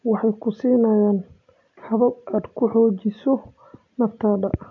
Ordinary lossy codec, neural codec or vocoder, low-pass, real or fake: AAC, 64 kbps; none; 7.2 kHz; real